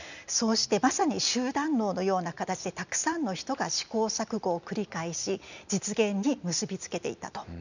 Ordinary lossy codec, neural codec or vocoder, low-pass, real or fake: none; none; 7.2 kHz; real